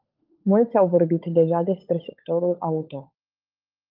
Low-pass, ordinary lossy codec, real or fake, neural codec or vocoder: 5.4 kHz; Opus, 24 kbps; fake; codec, 16 kHz, 16 kbps, FunCodec, trained on LibriTTS, 50 frames a second